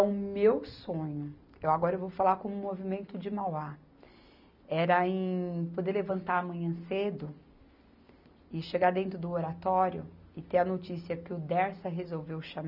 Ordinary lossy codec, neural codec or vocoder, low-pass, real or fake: none; none; 5.4 kHz; real